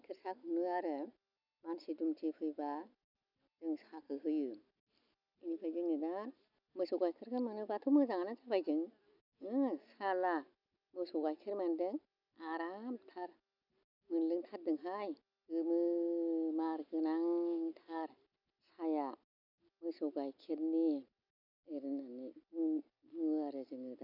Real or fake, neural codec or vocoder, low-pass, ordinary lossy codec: real; none; 5.4 kHz; none